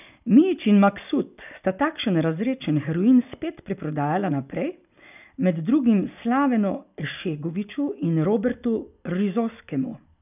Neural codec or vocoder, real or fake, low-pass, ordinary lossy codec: none; real; 3.6 kHz; none